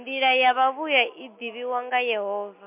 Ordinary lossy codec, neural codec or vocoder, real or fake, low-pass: MP3, 32 kbps; none; real; 3.6 kHz